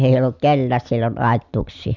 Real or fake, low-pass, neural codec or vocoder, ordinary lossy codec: real; 7.2 kHz; none; none